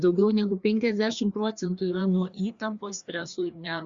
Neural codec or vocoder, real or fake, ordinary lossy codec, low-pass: codec, 16 kHz, 2 kbps, FreqCodec, larger model; fake; Opus, 64 kbps; 7.2 kHz